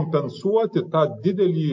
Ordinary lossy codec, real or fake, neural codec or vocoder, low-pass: MP3, 48 kbps; real; none; 7.2 kHz